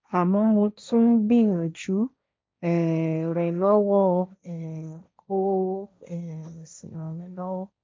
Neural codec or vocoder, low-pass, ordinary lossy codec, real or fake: codec, 16 kHz, 1.1 kbps, Voila-Tokenizer; none; none; fake